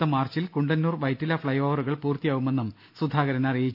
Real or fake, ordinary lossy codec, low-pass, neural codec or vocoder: real; none; 5.4 kHz; none